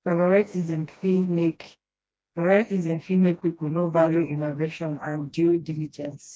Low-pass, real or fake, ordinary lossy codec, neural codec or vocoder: none; fake; none; codec, 16 kHz, 1 kbps, FreqCodec, smaller model